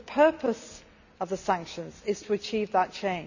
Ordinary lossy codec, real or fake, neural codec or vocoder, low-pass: none; real; none; 7.2 kHz